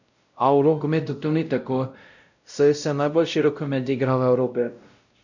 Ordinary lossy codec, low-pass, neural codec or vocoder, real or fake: none; 7.2 kHz; codec, 16 kHz, 0.5 kbps, X-Codec, WavLM features, trained on Multilingual LibriSpeech; fake